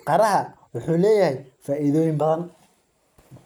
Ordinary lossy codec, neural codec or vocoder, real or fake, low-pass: none; none; real; none